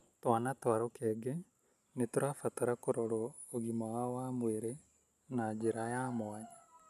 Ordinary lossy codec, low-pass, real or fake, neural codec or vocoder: none; 14.4 kHz; real; none